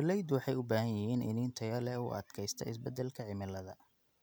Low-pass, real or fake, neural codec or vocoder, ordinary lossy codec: none; real; none; none